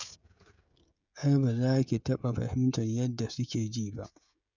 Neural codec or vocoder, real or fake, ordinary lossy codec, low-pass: codec, 16 kHz, 16 kbps, FreqCodec, smaller model; fake; none; 7.2 kHz